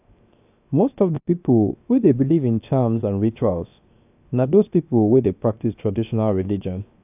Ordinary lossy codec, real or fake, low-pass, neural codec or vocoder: AAC, 32 kbps; fake; 3.6 kHz; codec, 16 kHz, 0.7 kbps, FocalCodec